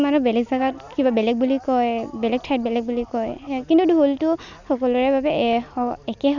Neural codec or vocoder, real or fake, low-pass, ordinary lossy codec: none; real; 7.2 kHz; none